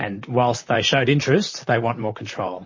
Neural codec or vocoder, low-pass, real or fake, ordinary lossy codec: vocoder, 44.1 kHz, 128 mel bands, Pupu-Vocoder; 7.2 kHz; fake; MP3, 32 kbps